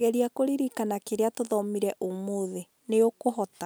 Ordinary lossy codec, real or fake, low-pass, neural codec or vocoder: none; real; none; none